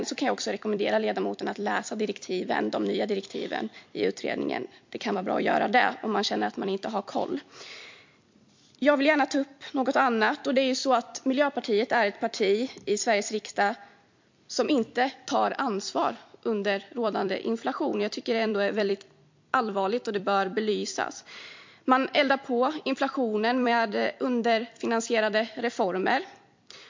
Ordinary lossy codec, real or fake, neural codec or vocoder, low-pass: MP3, 48 kbps; fake; vocoder, 44.1 kHz, 128 mel bands every 256 samples, BigVGAN v2; 7.2 kHz